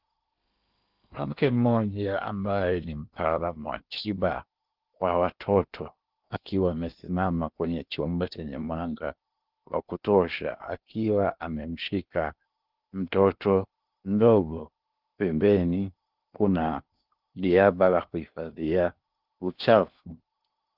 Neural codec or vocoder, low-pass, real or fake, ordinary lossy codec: codec, 16 kHz in and 24 kHz out, 0.8 kbps, FocalCodec, streaming, 65536 codes; 5.4 kHz; fake; Opus, 32 kbps